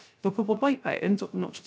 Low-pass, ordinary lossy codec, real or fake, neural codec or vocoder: none; none; fake; codec, 16 kHz, 0.3 kbps, FocalCodec